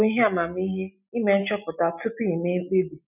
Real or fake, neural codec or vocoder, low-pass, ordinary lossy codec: real; none; 3.6 kHz; none